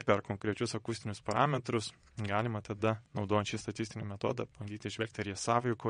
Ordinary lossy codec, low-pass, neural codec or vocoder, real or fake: MP3, 48 kbps; 9.9 kHz; vocoder, 22.05 kHz, 80 mel bands, WaveNeXt; fake